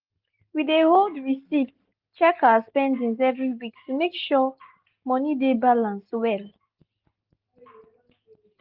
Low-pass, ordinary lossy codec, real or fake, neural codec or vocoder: 5.4 kHz; Opus, 24 kbps; real; none